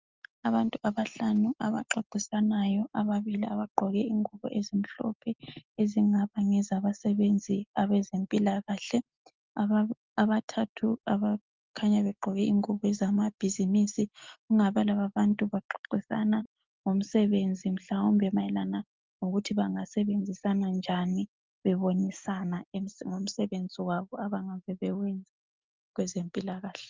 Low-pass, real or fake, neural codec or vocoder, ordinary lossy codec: 7.2 kHz; real; none; Opus, 32 kbps